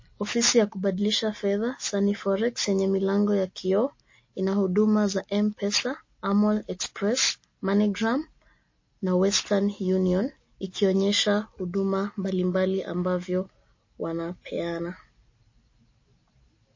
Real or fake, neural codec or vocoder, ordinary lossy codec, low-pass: real; none; MP3, 32 kbps; 7.2 kHz